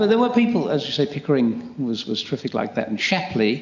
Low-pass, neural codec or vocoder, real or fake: 7.2 kHz; none; real